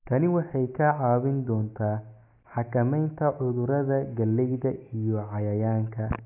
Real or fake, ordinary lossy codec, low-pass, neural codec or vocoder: real; AAC, 32 kbps; 3.6 kHz; none